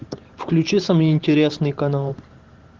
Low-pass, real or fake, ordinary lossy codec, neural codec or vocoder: 7.2 kHz; fake; Opus, 16 kbps; vocoder, 44.1 kHz, 128 mel bands every 512 samples, BigVGAN v2